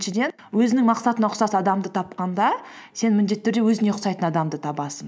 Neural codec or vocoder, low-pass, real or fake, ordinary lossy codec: none; none; real; none